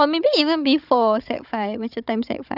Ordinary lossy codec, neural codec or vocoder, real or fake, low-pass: none; codec, 16 kHz, 16 kbps, FunCodec, trained on Chinese and English, 50 frames a second; fake; 5.4 kHz